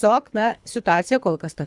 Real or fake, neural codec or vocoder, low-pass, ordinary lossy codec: fake; codec, 24 kHz, 3 kbps, HILCodec; 10.8 kHz; Opus, 64 kbps